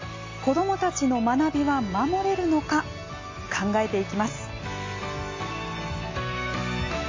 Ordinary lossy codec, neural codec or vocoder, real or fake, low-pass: MP3, 32 kbps; none; real; 7.2 kHz